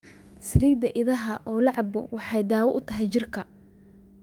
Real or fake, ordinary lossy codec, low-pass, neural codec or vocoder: fake; Opus, 32 kbps; 19.8 kHz; autoencoder, 48 kHz, 32 numbers a frame, DAC-VAE, trained on Japanese speech